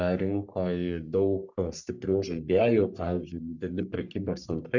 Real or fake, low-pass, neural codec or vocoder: fake; 7.2 kHz; codec, 44.1 kHz, 3.4 kbps, Pupu-Codec